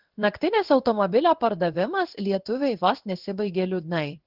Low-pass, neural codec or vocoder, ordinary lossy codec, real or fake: 5.4 kHz; codec, 16 kHz in and 24 kHz out, 1 kbps, XY-Tokenizer; Opus, 16 kbps; fake